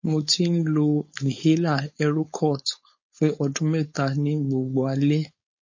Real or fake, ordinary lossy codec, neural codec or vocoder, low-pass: fake; MP3, 32 kbps; codec, 16 kHz, 4.8 kbps, FACodec; 7.2 kHz